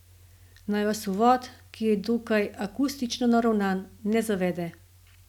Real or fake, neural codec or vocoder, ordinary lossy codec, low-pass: real; none; none; 19.8 kHz